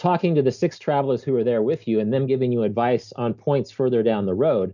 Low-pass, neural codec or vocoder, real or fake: 7.2 kHz; none; real